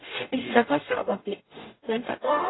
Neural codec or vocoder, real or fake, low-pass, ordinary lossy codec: codec, 44.1 kHz, 0.9 kbps, DAC; fake; 7.2 kHz; AAC, 16 kbps